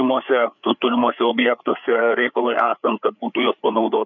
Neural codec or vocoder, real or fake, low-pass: codec, 16 kHz, 4 kbps, FreqCodec, larger model; fake; 7.2 kHz